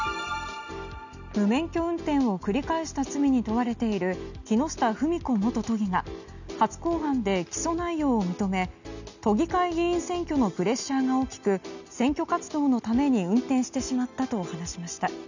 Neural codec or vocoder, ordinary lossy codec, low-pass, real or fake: none; none; 7.2 kHz; real